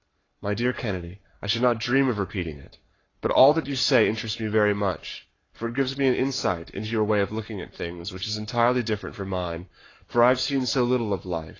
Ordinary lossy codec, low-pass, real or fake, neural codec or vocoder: AAC, 32 kbps; 7.2 kHz; fake; codec, 44.1 kHz, 7.8 kbps, Pupu-Codec